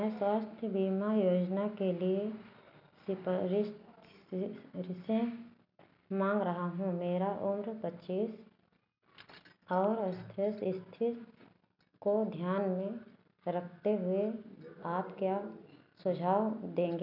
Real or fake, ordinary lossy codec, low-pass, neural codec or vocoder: real; none; 5.4 kHz; none